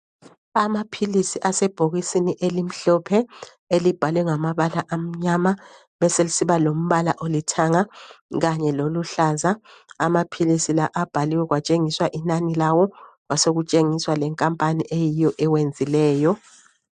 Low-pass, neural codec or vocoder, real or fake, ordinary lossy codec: 9.9 kHz; none; real; MP3, 64 kbps